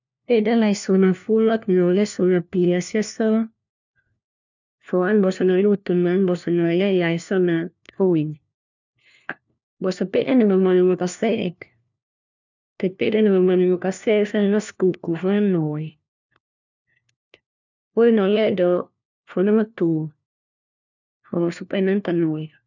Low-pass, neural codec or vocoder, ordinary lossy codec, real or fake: 7.2 kHz; codec, 16 kHz, 1 kbps, FunCodec, trained on LibriTTS, 50 frames a second; none; fake